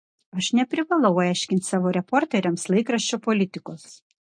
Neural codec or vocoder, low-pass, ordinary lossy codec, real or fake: none; 9.9 kHz; MP3, 48 kbps; real